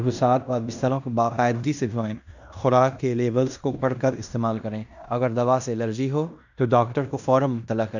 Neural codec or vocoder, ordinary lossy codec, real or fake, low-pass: codec, 16 kHz in and 24 kHz out, 0.9 kbps, LongCat-Audio-Codec, fine tuned four codebook decoder; none; fake; 7.2 kHz